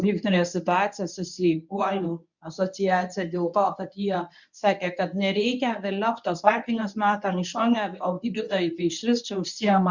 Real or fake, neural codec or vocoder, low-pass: fake; codec, 24 kHz, 0.9 kbps, WavTokenizer, medium speech release version 1; 7.2 kHz